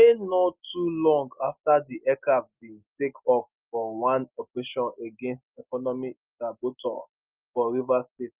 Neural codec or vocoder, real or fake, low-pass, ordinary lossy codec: none; real; 3.6 kHz; Opus, 24 kbps